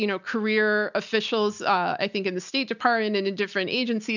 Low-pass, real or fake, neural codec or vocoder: 7.2 kHz; real; none